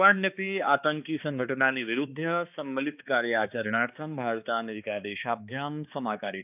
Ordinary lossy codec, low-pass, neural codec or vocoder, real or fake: none; 3.6 kHz; codec, 16 kHz, 2 kbps, X-Codec, HuBERT features, trained on balanced general audio; fake